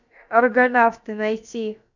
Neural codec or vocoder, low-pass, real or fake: codec, 16 kHz, about 1 kbps, DyCAST, with the encoder's durations; 7.2 kHz; fake